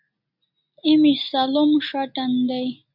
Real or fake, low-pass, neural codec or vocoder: real; 5.4 kHz; none